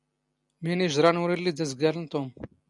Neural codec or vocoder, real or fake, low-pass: none; real; 10.8 kHz